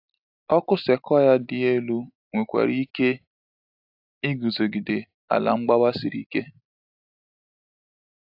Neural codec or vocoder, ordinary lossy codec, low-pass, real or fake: none; AAC, 48 kbps; 5.4 kHz; real